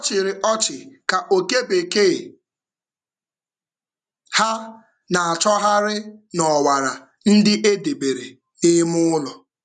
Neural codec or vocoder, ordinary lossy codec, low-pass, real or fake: none; none; 10.8 kHz; real